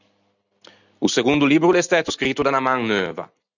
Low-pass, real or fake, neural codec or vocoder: 7.2 kHz; real; none